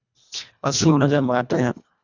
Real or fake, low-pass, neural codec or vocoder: fake; 7.2 kHz; codec, 24 kHz, 1.5 kbps, HILCodec